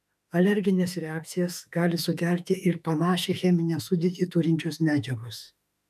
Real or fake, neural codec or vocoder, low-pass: fake; autoencoder, 48 kHz, 32 numbers a frame, DAC-VAE, trained on Japanese speech; 14.4 kHz